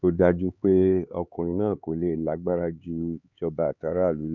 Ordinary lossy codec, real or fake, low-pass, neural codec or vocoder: none; fake; 7.2 kHz; codec, 16 kHz, 4 kbps, X-Codec, HuBERT features, trained on LibriSpeech